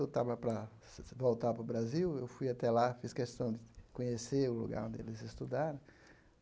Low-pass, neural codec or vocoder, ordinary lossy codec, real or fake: none; none; none; real